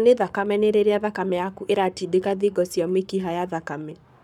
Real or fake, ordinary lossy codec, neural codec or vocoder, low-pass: fake; none; codec, 44.1 kHz, 7.8 kbps, Pupu-Codec; 19.8 kHz